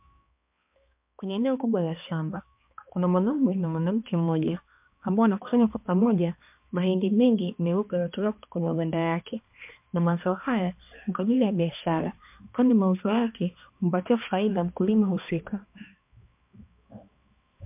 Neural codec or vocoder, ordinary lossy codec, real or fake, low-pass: codec, 16 kHz, 2 kbps, X-Codec, HuBERT features, trained on balanced general audio; MP3, 32 kbps; fake; 3.6 kHz